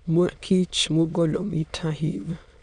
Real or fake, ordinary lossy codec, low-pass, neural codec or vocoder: fake; none; 9.9 kHz; autoencoder, 22.05 kHz, a latent of 192 numbers a frame, VITS, trained on many speakers